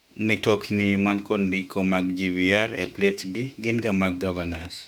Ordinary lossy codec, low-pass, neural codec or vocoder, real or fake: none; 19.8 kHz; autoencoder, 48 kHz, 32 numbers a frame, DAC-VAE, trained on Japanese speech; fake